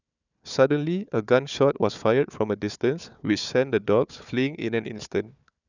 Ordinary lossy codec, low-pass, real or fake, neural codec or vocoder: none; 7.2 kHz; fake; codec, 16 kHz, 4 kbps, FunCodec, trained on Chinese and English, 50 frames a second